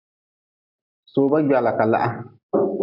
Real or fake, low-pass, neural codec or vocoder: real; 5.4 kHz; none